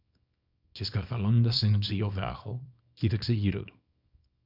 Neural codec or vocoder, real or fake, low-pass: codec, 24 kHz, 0.9 kbps, WavTokenizer, small release; fake; 5.4 kHz